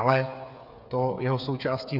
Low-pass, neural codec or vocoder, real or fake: 5.4 kHz; codec, 16 kHz, 8 kbps, FreqCodec, smaller model; fake